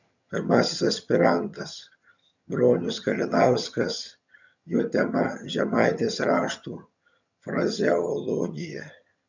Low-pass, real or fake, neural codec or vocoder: 7.2 kHz; fake; vocoder, 22.05 kHz, 80 mel bands, HiFi-GAN